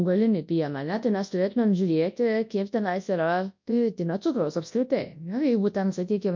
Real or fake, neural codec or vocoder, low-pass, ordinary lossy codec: fake; codec, 24 kHz, 0.9 kbps, WavTokenizer, large speech release; 7.2 kHz; MP3, 48 kbps